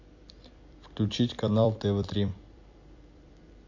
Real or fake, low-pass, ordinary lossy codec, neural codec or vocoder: fake; 7.2 kHz; MP3, 48 kbps; vocoder, 44.1 kHz, 128 mel bands every 256 samples, BigVGAN v2